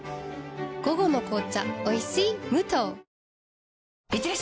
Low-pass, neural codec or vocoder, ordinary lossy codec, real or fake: none; none; none; real